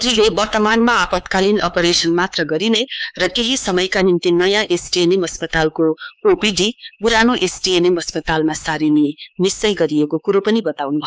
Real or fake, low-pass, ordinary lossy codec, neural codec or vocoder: fake; none; none; codec, 16 kHz, 4 kbps, X-Codec, HuBERT features, trained on LibriSpeech